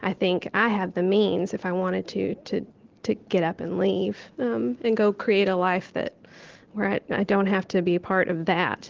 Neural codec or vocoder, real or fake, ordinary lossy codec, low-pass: none; real; Opus, 16 kbps; 7.2 kHz